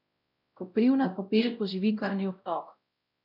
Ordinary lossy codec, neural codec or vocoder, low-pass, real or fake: MP3, 48 kbps; codec, 16 kHz, 0.5 kbps, X-Codec, WavLM features, trained on Multilingual LibriSpeech; 5.4 kHz; fake